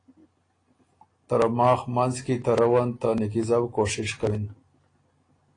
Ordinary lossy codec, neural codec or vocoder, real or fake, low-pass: AAC, 32 kbps; none; real; 9.9 kHz